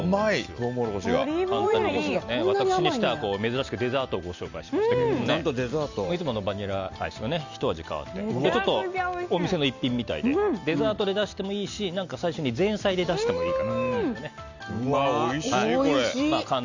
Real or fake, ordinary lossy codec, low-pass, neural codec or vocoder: real; Opus, 64 kbps; 7.2 kHz; none